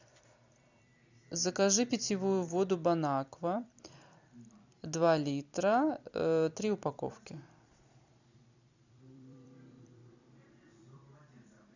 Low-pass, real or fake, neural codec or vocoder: 7.2 kHz; fake; vocoder, 44.1 kHz, 128 mel bands every 256 samples, BigVGAN v2